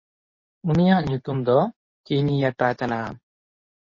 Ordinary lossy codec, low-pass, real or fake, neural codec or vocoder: MP3, 32 kbps; 7.2 kHz; fake; codec, 24 kHz, 0.9 kbps, WavTokenizer, medium speech release version 2